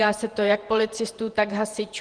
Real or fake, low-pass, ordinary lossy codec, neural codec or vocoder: fake; 9.9 kHz; Opus, 24 kbps; vocoder, 48 kHz, 128 mel bands, Vocos